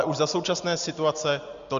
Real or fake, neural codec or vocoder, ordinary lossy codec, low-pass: real; none; Opus, 64 kbps; 7.2 kHz